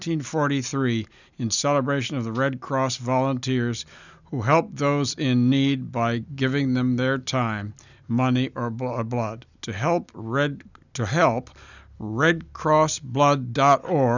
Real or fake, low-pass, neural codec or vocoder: real; 7.2 kHz; none